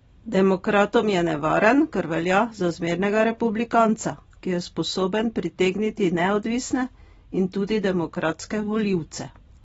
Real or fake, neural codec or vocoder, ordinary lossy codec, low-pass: real; none; AAC, 24 kbps; 19.8 kHz